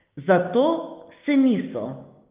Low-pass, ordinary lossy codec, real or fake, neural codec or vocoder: 3.6 kHz; Opus, 24 kbps; fake; codec, 16 kHz, 6 kbps, DAC